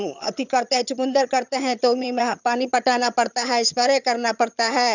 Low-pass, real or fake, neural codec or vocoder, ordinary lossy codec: 7.2 kHz; fake; vocoder, 22.05 kHz, 80 mel bands, HiFi-GAN; none